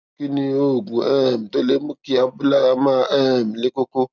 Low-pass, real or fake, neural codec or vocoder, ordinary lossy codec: 7.2 kHz; real; none; none